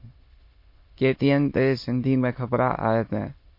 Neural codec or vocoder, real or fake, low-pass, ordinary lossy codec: autoencoder, 22.05 kHz, a latent of 192 numbers a frame, VITS, trained on many speakers; fake; 5.4 kHz; MP3, 32 kbps